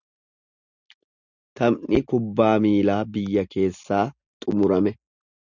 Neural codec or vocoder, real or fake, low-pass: none; real; 7.2 kHz